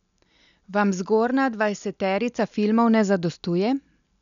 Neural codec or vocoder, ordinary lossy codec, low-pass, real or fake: none; none; 7.2 kHz; real